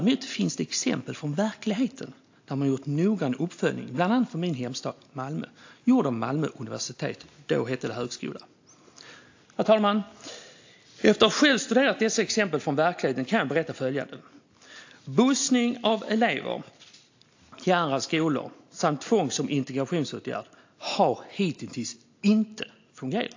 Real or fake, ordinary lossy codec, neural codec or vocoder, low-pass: real; AAC, 48 kbps; none; 7.2 kHz